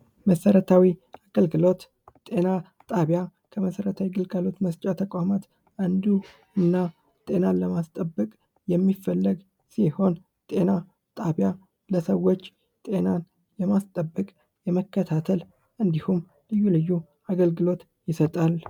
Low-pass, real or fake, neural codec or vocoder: 19.8 kHz; real; none